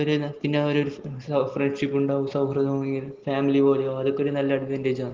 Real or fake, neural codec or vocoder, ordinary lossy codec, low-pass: real; none; Opus, 16 kbps; 7.2 kHz